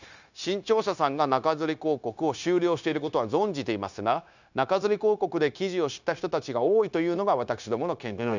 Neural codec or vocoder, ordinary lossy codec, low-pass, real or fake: codec, 16 kHz, 0.9 kbps, LongCat-Audio-Codec; none; 7.2 kHz; fake